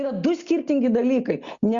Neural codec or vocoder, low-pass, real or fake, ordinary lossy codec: none; 7.2 kHz; real; Opus, 64 kbps